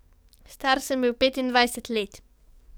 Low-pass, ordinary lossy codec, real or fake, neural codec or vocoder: none; none; fake; codec, 44.1 kHz, 7.8 kbps, DAC